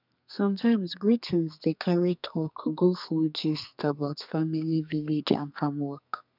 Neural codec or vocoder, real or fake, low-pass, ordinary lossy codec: codec, 32 kHz, 1.9 kbps, SNAC; fake; 5.4 kHz; none